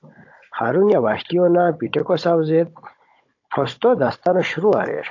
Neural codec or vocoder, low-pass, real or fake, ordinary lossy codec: codec, 16 kHz, 16 kbps, FunCodec, trained on Chinese and English, 50 frames a second; 7.2 kHz; fake; AAC, 48 kbps